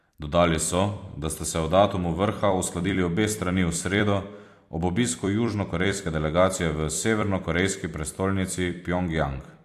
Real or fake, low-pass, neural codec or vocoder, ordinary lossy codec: fake; 14.4 kHz; vocoder, 44.1 kHz, 128 mel bands every 256 samples, BigVGAN v2; AAC, 64 kbps